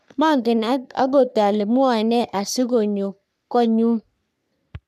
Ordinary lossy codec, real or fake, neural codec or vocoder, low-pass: none; fake; codec, 44.1 kHz, 3.4 kbps, Pupu-Codec; 14.4 kHz